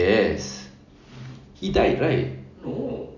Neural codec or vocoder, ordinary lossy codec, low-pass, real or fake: none; none; 7.2 kHz; real